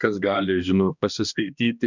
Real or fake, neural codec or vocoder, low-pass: fake; codec, 16 kHz, 1 kbps, X-Codec, HuBERT features, trained on balanced general audio; 7.2 kHz